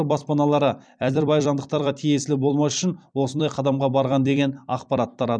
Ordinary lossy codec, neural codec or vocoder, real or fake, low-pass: none; vocoder, 44.1 kHz, 128 mel bands every 256 samples, BigVGAN v2; fake; 9.9 kHz